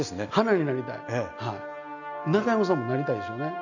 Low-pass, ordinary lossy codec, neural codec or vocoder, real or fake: 7.2 kHz; none; none; real